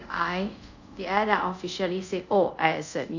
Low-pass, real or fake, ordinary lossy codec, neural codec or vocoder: 7.2 kHz; fake; none; codec, 24 kHz, 0.5 kbps, DualCodec